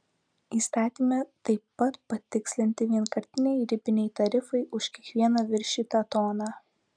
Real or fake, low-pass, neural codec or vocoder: real; 9.9 kHz; none